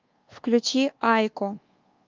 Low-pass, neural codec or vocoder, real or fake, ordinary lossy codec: 7.2 kHz; codec, 24 kHz, 1.2 kbps, DualCodec; fake; Opus, 32 kbps